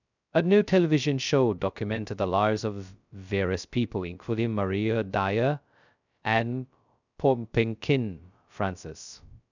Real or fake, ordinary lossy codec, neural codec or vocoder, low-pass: fake; none; codec, 16 kHz, 0.2 kbps, FocalCodec; 7.2 kHz